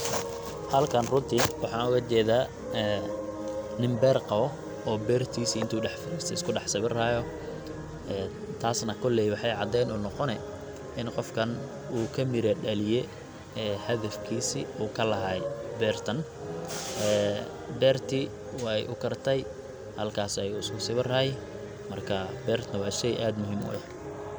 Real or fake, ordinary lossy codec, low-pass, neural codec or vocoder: real; none; none; none